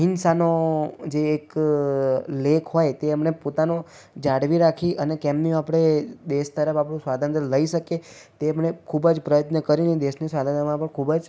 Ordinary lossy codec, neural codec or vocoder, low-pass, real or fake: none; none; none; real